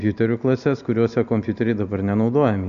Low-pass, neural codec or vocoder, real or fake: 7.2 kHz; none; real